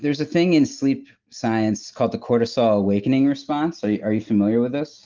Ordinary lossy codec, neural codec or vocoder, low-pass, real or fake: Opus, 32 kbps; none; 7.2 kHz; real